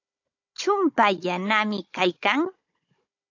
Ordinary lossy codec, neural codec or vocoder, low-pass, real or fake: AAC, 48 kbps; codec, 16 kHz, 16 kbps, FunCodec, trained on Chinese and English, 50 frames a second; 7.2 kHz; fake